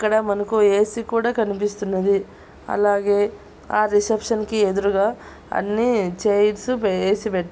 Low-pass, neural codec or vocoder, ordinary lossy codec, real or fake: none; none; none; real